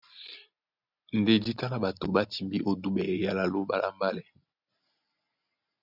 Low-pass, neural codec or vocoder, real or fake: 5.4 kHz; none; real